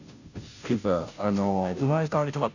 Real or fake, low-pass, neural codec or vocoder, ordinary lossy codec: fake; 7.2 kHz; codec, 16 kHz, 0.5 kbps, FunCodec, trained on Chinese and English, 25 frames a second; none